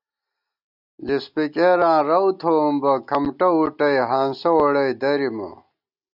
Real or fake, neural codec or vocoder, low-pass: real; none; 5.4 kHz